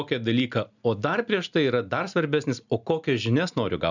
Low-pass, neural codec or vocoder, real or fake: 7.2 kHz; none; real